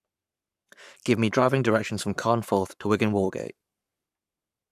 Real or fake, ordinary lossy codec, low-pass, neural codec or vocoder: fake; none; 14.4 kHz; codec, 44.1 kHz, 7.8 kbps, Pupu-Codec